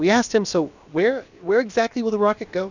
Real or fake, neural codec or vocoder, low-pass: fake; codec, 16 kHz, about 1 kbps, DyCAST, with the encoder's durations; 7.2 kHz